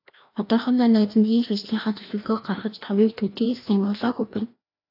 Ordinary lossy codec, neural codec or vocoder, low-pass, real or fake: AAC, 32 kbps; codec, 16 kHz, 1 kbps, FreqCodec, larger model; 5.4 kHz; fake